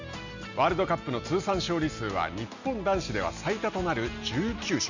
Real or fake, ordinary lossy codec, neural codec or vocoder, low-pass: real; none; none; 7.2 kHz